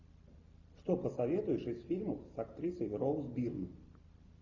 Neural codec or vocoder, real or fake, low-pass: none; real; 7.2 kHz